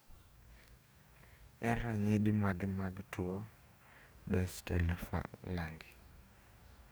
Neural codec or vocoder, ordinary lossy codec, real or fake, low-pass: codec, 44.1 kHz, 2.6 kbps, DAC; none; fake; none